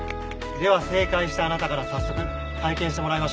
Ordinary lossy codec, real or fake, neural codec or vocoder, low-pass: none; real; none; none